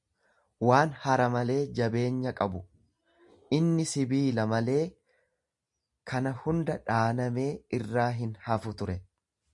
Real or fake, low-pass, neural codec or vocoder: real; 10.8 kHz; none